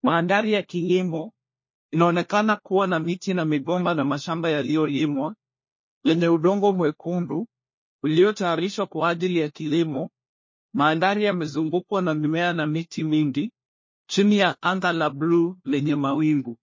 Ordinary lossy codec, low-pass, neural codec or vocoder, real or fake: MP3, 32 kbps; 7.2 kHz; codec, 16 kHz, 1 kbps, FunCodec, trained on LibriTTS, 50 frames a second; fake